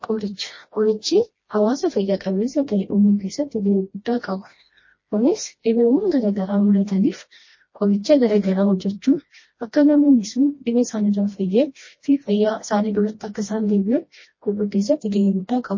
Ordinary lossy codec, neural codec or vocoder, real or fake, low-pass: MP3, 32 kbps; codec, 16 kHz, 1 kbps, FreqCodec, smaller model; fake; 7.2 kHz